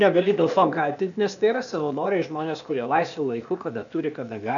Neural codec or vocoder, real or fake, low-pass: codec, 16 kHz, 0.8 kbps, ZipCodec; fake; 7.2 kHz